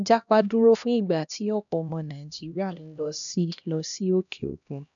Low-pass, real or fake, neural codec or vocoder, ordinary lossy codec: 7.2 kHz; fake; codec, 16 kHz, 1 kbps, X-Codec, HuBERT features, trained on LibriSpeech; none